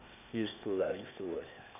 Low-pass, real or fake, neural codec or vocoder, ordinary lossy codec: 3.6 kHz; fake; codec, 16 kHz, 0.8 kbps, ZipCodec; none